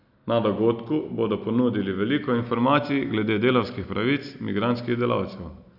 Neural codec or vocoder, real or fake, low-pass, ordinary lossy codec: none; real; 5.4 kHz; none